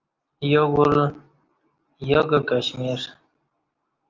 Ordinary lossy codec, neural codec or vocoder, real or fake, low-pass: Opus, 32 kbps; none; real; 7.2 kHz